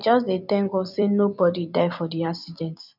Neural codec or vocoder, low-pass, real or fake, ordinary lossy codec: vocoder, 44.1 kHz, 128 mel bands every 256 samples, BigVGAN v2; 5.4 kHz; fake; none